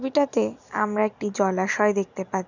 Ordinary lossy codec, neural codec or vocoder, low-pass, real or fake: none; none; 7.2 kHz; real